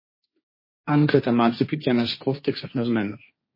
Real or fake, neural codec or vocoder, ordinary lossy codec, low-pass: fake; codec, 16 kHz, 1.1 kbps, Voila-Tokenizer; MP3, 24 kbps; 5.4 kHz